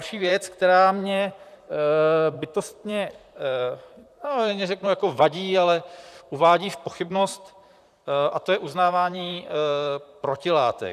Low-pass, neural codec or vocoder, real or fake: 14.4 kHz; vocoder, 44.1 kHz, 128 mel bands, Pupu-Vocoder; fake